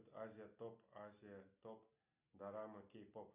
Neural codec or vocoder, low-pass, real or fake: none; 3.6 kHz; real